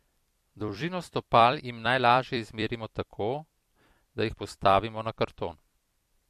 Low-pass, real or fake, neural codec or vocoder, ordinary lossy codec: 14.4 kHz; fake; vocoder, 44.1 kHz, 128 mel bands every 256 samples, BigVGAN v2; MP3, 64 kbps